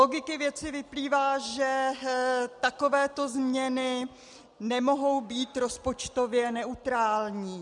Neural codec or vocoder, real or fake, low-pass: none; real; 10.8 kHz